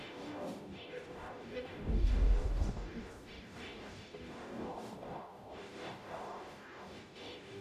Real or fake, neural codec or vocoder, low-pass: fake; codec, 44.1 kHz, 0.9 kbps, DAC; 14.4 kHz